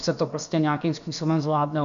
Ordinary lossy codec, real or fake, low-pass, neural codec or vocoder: AAC, 96 kbps; fake; 7.2 kHz; codec, 16 kHz, about 1 kbps, DyCAST, with the encoder's durations